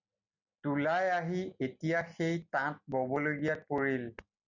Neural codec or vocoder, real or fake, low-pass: none; real; 7.2 kHz